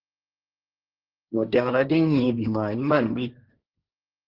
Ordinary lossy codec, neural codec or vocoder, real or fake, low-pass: Opus, 24 kbps; codec, 16 kHz, 1.1 kbps, Voila-Tokenizer; fake; 5.4 kHz